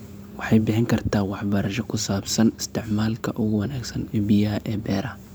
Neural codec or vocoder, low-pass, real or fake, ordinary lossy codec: vocoder, 44.1 kHz, 128 mel bands every 512 samples, BigVGAN v2; none; fake; none